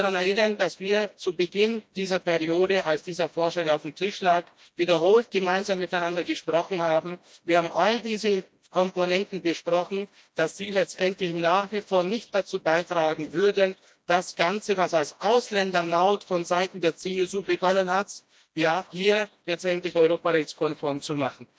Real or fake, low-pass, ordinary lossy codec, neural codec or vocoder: fake; none; none; codec, 16 kHz, 1 kbps, FreqCodec, smaller model